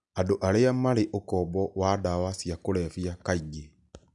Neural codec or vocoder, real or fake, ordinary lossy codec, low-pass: none; real; none; 10.8 kHz